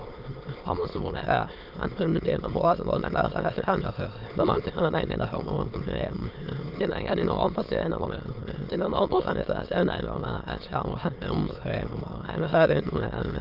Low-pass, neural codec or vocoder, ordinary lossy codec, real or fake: 5.4 kHz; autoencoder, 22.05 kHz, a latent of 192 numbers a frame, VITS, trained on many speakers; Opus, 32 kbps; fake